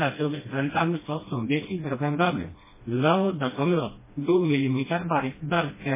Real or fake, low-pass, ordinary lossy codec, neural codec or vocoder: fake; 3.6 kHz; MP3, 16 kbps; codec, 16 kHz, 1 kbps, FreqCodec, smaller model